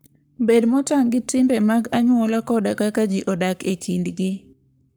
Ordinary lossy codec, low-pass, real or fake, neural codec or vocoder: none; none; fake; codec, 44.1 kHz, 7.8 kbps, Pupu-Codec